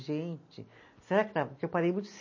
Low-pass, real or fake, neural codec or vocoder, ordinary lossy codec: 7.2 kHz; real; none; MP3, 32 kbps